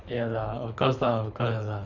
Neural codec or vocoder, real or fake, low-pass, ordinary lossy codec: codec, 24 kHz, 3 kbps, HILCodec; fake; 7.2 kHz; none